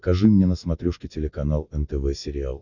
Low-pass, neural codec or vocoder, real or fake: 7.2 kHz; none; real